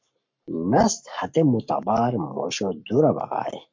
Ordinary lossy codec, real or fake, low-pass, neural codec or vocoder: MP3, 48 kbps; fake; 7.2 kHz; codec, 44.1 kHz, 7.8 kbps, Pupu-Codec